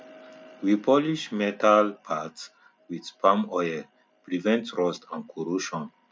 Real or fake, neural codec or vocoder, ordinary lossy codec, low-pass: real; none; none; none